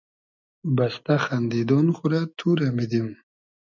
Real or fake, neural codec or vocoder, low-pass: real; none; 7.2 kHz